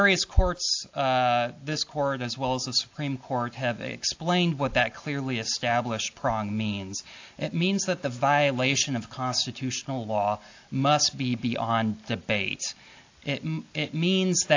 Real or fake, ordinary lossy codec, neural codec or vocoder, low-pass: real; AAC, 48 kbps; none; 7.2 kHz